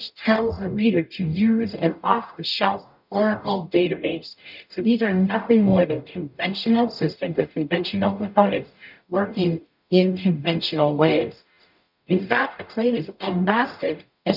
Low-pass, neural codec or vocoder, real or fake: 5.4 kHz; codec, 44.1 kHz, 0.9 kbps, DAC; fake